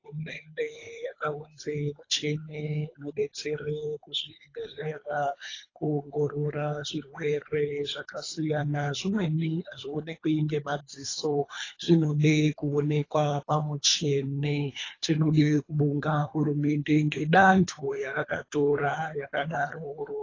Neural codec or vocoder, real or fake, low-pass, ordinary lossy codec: codec, 24 kHz, 3 kbps, HILCodec; fake; 7.2 kHz; AAC, 32 kbps